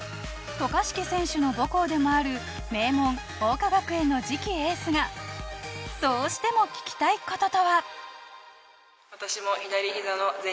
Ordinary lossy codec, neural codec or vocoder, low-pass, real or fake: none; none; none; real